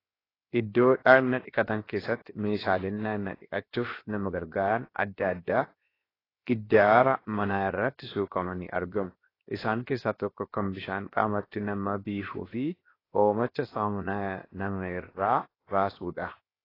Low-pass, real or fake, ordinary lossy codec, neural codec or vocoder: 5.4 kHz; fake; AAC, 24 kbps; codec, 16 kHz, 0.7 kbps, FocalCodec